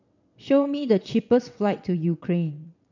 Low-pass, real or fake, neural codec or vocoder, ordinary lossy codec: 7.2 kHz; fake; vocoder, 22.05 kHz, 80 mel bands, WaveNeXt; none